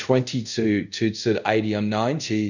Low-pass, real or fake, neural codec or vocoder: 7.2 kHz; fake; codec, 24 kHz, 0.5 kbps, DualCodec